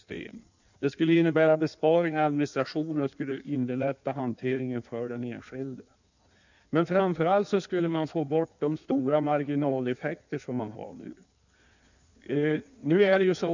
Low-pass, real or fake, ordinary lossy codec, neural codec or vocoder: 7.2 kHz; fake; none; codec, 16 kHz in and 24 kHz out, 1.1 kbps, FireRedTTS-2 codec